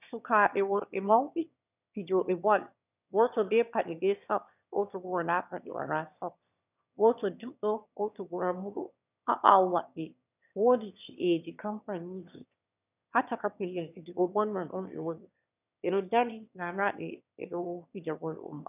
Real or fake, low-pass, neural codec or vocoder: fake; 3.6 kHz; autoencoder, 22.05 kHz, a latent of 192 numbers a frame, VITS, trained on one speaker